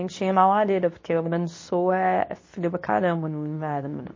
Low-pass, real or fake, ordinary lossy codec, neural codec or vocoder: 7.2 kHz; fake; MP3, 32 kbps; codec, 24 kHz, 0.9 kbps, WavTokenizer, medium speech release version 2